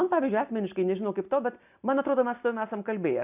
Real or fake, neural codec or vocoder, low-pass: real; none; 3.6 kHz